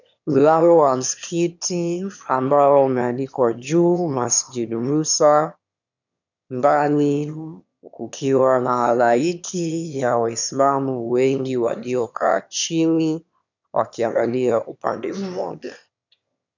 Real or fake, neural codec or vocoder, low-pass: fake; autoencoder, 22.05 kHz, a latent of 192 numbers a frame, VITS, trained on one speaker; 7.2 kHz